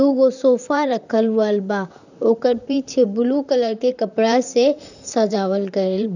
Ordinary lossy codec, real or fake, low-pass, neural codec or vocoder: none; fake; 7.2 kHz; vocoder, 44.1 kHz, 128 mel bands, Pupu-Vocoder